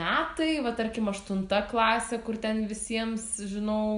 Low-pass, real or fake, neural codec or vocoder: 10.8 kHz; real; none